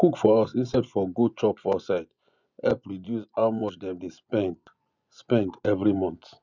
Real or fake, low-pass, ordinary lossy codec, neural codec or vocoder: fake; 7.2 kHz; none; vocoder, 24 kHz, 100 mel bands, Vocos